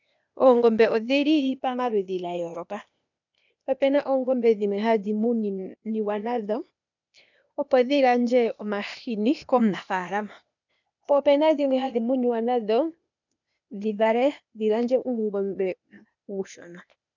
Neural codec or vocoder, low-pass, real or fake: codec, 16 kHz, 0.8 kbps, ZipCodec; 7.2 kHz; fake